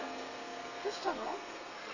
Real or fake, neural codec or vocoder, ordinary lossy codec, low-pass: fake; codec, 32 kHz, 1.9 kbps, SNAC; none; 7.2 kHz